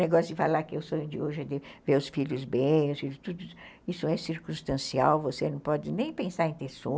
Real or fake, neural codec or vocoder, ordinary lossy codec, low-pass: real; none; none; none